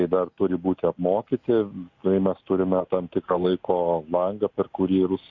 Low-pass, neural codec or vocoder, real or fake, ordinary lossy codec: 7.2 kHz; none; real; Opus, 64 kbps